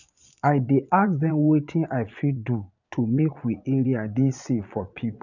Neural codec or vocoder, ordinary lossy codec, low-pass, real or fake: vocoder, 22.05 kHz, 80 mel bands, Vocos; none; 7.2 kHz; fake